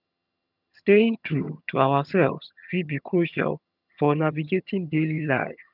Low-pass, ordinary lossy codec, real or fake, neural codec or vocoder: 5.4 kHz; none; fake; vocoder, 22.05 kHz, 80 mel bands, HiFi-GAN